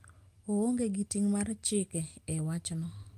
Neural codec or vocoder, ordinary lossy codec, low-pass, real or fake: none; Opus, 32 kbps; 14.4 kHz; real